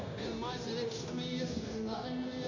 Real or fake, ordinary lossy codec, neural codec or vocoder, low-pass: fake; AAC, 32 kbps; codec, 16 kHz, 0.9 kbps, LongCat-Audio-Codec; 7.2 kHz